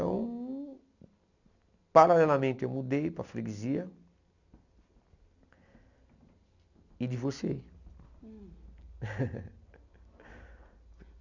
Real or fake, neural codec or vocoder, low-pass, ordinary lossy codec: real; none; 7.2 kHz; none